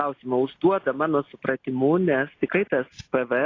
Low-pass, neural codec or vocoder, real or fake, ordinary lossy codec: 7.2 kHz; none; real; AAC, 32 kbps